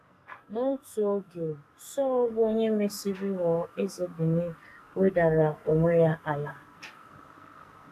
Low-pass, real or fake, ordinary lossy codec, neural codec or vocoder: 14.4 kHz; fake; none; codec, 44.1 kHz, 2.6 kbps, SNAC